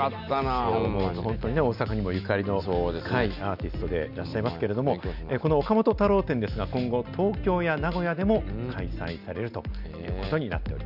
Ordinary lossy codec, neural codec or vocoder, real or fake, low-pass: none; none; real; 5.4 kHz